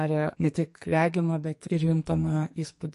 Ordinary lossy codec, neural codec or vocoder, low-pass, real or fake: MP3, 48 kbps; codec, 44.1 kHz, 2.6 kbps, SNAC; 14.4 kHz; fake